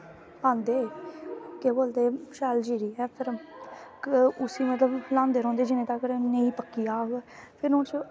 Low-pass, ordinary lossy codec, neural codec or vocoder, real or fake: none; none; none; real